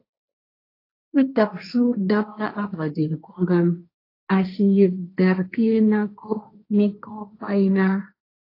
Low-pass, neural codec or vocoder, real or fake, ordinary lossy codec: 5.4 kHz; codec, 16 kHz, 1.1 kbps, Voila-Tokenizer; fake; AAC, 32 kbps